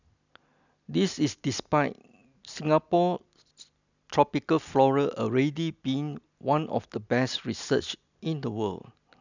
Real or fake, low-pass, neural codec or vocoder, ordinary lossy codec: real; 7.2 kHz; none; none